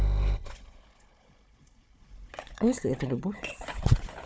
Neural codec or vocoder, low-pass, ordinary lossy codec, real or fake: codec, 16 kHz, 4 kbps, FunCodec, trained on Chinese and English, 50 frames a second; none; none; fake